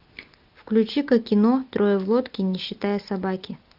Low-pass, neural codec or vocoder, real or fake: 5.4 kHz; none; real